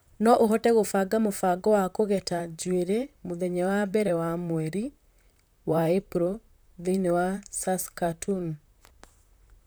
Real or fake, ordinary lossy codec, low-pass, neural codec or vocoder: fake; none; none; vocoder, 44.1 kHz, 128 mel bands, Pupu-Vocoder